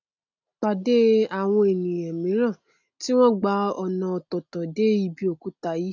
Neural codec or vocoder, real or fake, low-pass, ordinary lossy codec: none; real; 7.2 kHz; none